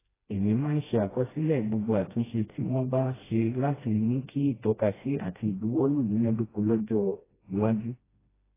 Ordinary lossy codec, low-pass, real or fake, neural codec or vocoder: AAC, 16 kbps; 3.6 kHz; fake; codec, 16 kHz, 1 kbps, FreqCodec, smaller model